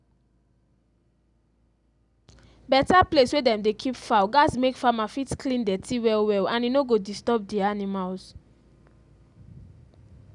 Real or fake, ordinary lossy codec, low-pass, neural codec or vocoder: real; none; 10.8 kHz; none